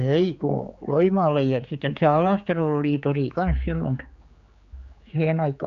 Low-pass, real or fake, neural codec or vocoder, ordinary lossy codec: 7.2 kHz; fake; codec, 16 kHz, 4 kbps, X-Codec, HuBERT features, trained on general audio; Opus, 64 kbps